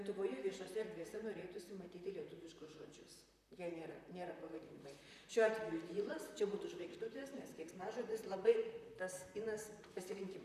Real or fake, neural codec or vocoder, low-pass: fake; vocoder, 44.1 kHz, 128 mel bands, Pupu-Vocoder; 14.4 kHz